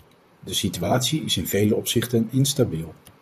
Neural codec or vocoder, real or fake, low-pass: vocoder, 44.1 kHz, 128 mel bands, Pupu-Vocoder; fake; 14.4 kHz